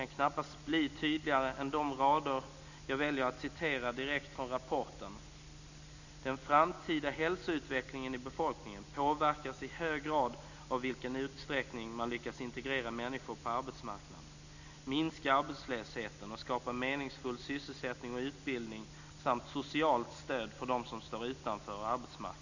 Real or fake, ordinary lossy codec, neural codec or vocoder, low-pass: real; none; none; 7.2 kHz